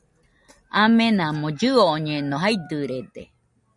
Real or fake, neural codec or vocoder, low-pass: real; none; 10.8 kHz